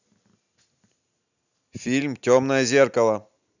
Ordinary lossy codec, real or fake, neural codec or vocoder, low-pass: none; real; none; 7.2 kHz